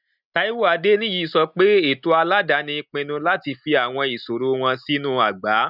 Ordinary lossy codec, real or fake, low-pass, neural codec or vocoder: none; real; 5.4 kHz; none